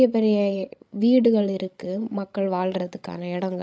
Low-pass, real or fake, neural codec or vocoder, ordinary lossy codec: none; fake; codec, 16 kHz, 16 kbps, FreqCodec, larger model; none